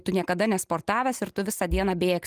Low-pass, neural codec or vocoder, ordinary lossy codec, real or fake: 14.4 kHz; none; Opus, 32 kbps; real